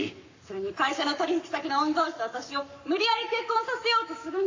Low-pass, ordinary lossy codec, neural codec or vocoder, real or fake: 7.2 kHz; AAC, 48 kbps; codec, 44.1 kHz, 7.8 kbps, Pupu-Codec; fake